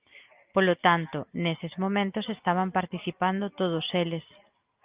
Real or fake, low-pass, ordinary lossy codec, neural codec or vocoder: real; 3.6 kHz; Opus, 24 kbps; none